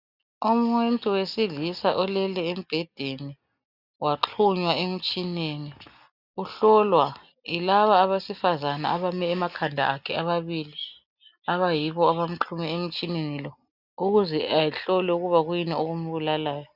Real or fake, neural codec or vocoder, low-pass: real; none; 5.4 kHz